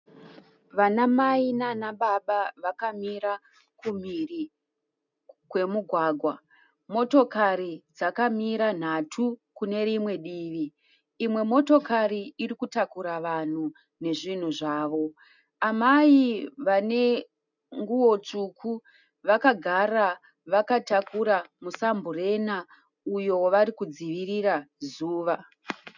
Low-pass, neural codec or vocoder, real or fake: 7.2 kHz; none; real